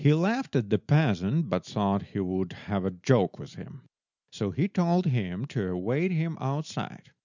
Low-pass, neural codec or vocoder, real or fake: 7.2 kHz; none; real